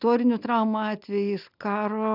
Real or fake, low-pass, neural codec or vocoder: real; 5.4 kHz; none